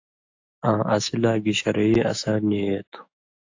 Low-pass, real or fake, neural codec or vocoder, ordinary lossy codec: 7.2 kHz; fake; autoencoder, 48 kHz, 128 numbers a frame, DAC-VAE, trained on Japanese speech; AAC, 48 kbps